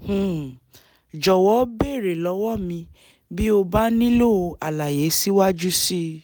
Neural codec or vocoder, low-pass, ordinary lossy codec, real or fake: none; none; none; real